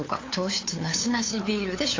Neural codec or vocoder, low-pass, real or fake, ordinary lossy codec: codec, 16 kHz, 8 kbps, FunCodec, trained on LibriTTS, 25 frames a second; 7.2 kHz; fake; AAC, 32 kbps